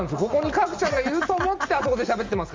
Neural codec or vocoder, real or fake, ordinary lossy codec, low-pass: none; real; Opus, 32 kbps; 7.2 kHz